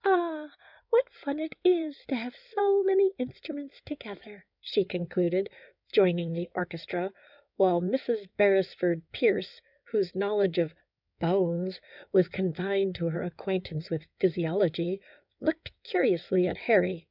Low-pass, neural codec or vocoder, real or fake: 5.4 kHz; codec, 16 kHz in and 24 kHz out, 2.2 kbps, FireRedTTS-2 codec; fake